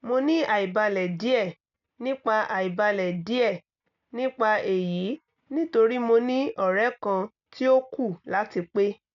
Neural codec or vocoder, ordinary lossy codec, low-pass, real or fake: none; none; 7.2 kHz; real